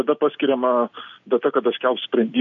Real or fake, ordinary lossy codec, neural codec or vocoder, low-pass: real; AAC, 64 kbps; none; 7.2 kHz